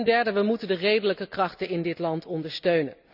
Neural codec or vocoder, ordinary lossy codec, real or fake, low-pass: none; none; real; 5.4 kHz